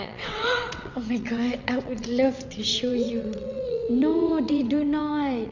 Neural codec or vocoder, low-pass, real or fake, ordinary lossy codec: vocoder, 22.05 kHz, 80 mel bands, WaveNeXt; 7.2 kHz; fake; none